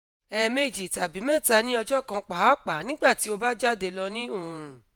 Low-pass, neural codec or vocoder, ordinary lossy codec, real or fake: none; vocoder, 48 kHz, 128 mel bands, Vocos; none; fake